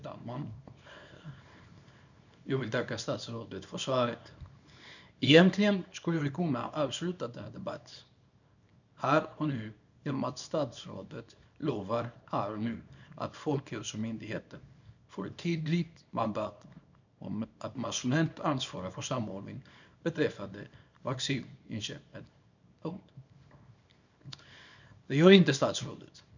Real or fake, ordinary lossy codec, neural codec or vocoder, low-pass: fake; none; codec, 24 kHz, 0.9 kbps, WavTokenizer, small release; 7.2 kHz